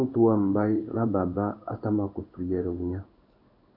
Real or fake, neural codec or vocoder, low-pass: fake; codec, 16 kHz in and 24 kHz out, 1 kbps, XY-Tokenizer; 5.4 kHz